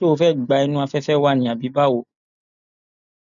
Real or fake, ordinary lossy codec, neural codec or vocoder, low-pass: real; none; none; 7.2 kHz